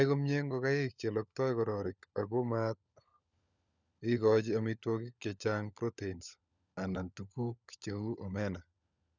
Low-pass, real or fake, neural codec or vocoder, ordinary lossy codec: 7.2 kHz; fake; codec, 16 kHz, 16 kbps, FunCodec, trained on LibriTTS, 50 frames a second; none